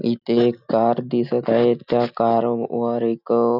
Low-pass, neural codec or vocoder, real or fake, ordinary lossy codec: 5.4 kHz; none; real; none